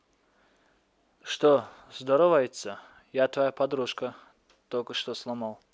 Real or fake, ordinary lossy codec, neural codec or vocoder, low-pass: real; none; none; none